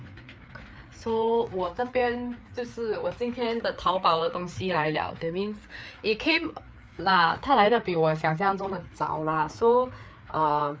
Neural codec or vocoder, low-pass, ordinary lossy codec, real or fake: codec, 16 kHz, 4 kbps, FreqCodec, larger model; none; none; fake